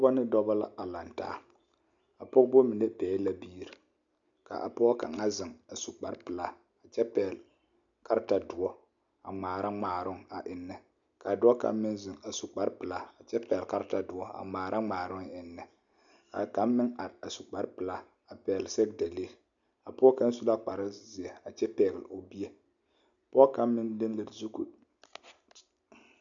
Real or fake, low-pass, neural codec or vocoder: real; 7.2 kHz; none